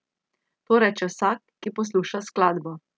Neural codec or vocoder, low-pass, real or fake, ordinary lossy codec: none; none; real; none